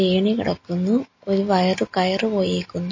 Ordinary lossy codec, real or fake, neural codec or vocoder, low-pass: MP3, 32 kbps; real; none; 7.2 kHz